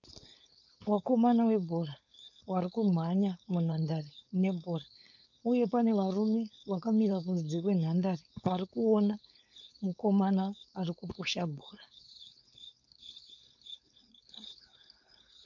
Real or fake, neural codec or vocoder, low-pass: fake; codec, 16 kHz, 4.8 kbps, FACodec; 7.2 kHz